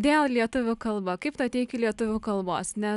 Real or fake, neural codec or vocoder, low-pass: real; none; 10.8 kHz